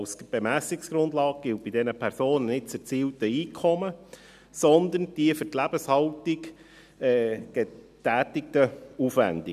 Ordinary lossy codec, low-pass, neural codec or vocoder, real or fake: none; 14.4 kHz; none; real